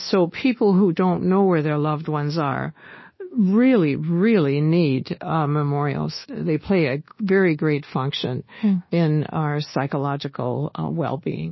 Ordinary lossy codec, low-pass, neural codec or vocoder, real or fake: MP3, 24 kbps; 7.2 kHz; codec, 24 kHz, 1.2 kbps, DualCodec; fake